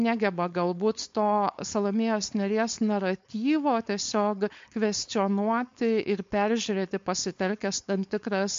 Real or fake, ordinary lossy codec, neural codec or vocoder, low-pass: fake; MP3, 48 kbps; codec, 16 kHz, 4.8 kbps, FACodec; 7.2 kHz